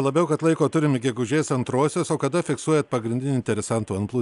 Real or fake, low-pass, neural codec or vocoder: real; 10.8 kHz; none